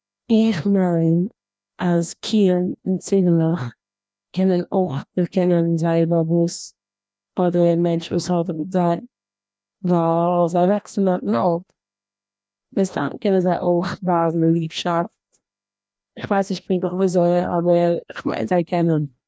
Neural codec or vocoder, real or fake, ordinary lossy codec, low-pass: codec, 16 kHz, 1 kbps, FreqCodec, larger model; fake; none; none